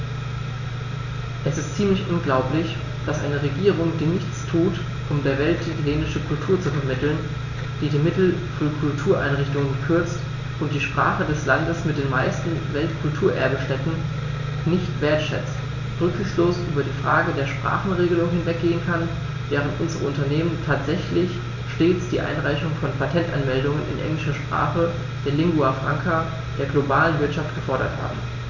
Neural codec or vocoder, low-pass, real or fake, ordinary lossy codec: none; 7.2 kHz; real; none